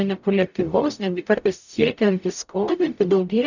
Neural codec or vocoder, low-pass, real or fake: codec, 44.1 kHz, 0.9 kbps, DAC; 7.2 kHz; fake